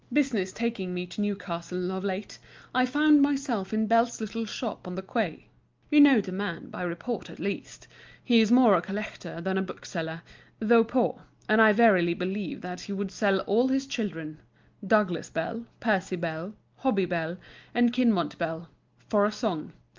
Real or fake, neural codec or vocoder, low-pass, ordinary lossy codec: real; none; 7.2 kHz; Opus, 24 kbps